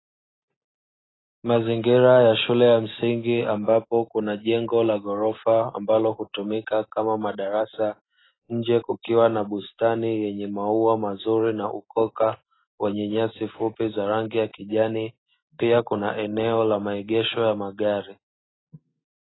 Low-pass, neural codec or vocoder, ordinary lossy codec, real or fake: 7.2 kHz; none; AAC, 16 kbps; real